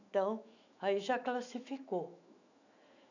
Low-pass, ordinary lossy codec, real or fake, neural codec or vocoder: 7.2 kHz; none; fake; autoencoder, 48 kHz, 128 numbers a frame, DAC-VAE, trained on Japanese speech